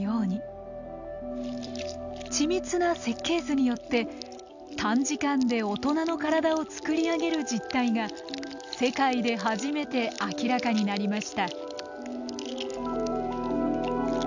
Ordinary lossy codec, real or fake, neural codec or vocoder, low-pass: none; real; none; 7.2 kHz